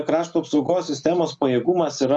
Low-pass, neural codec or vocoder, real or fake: 9.9 kHz; none; real